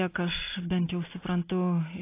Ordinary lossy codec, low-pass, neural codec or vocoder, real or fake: AAC, 24 kbps; 3.6 kHz; none; real